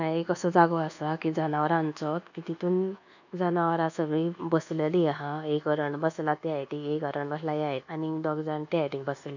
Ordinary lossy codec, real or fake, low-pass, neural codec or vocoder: none; fake; 7.2 kHz; codec, 24 kHz, 1.2 kbps, DualCodec